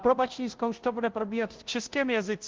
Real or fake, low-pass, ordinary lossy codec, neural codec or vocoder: fake; 7.2 kHz; Opus, 16 kbps; codec, 16 kHz, 0.5 kbps, FunCodec, trained on Chinese and English, 25 frames a second